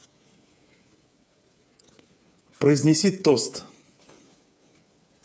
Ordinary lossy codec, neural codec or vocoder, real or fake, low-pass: none; codec, 16 kHz, 8 kbps, FreqCodec, smaller model; fake; none